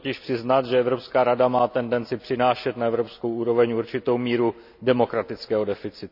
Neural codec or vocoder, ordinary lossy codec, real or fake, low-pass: none; none; real; 5.4 kHz